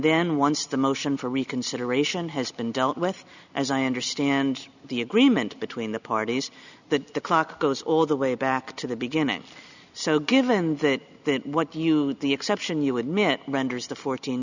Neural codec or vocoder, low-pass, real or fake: none; 7.2 kHz; real